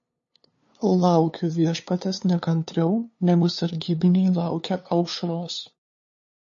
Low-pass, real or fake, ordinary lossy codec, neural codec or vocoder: 7.2 kHz; fake; MP3, 32 kbps; codec, 16 kHz, 2 kbps, FunCodec, trained on LibriTTS, 25 frames a second